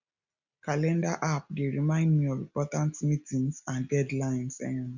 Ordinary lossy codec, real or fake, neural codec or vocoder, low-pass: none; real; none; 7.2 kHz